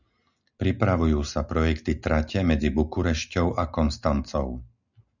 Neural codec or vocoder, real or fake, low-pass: none; real; 7.2 kHz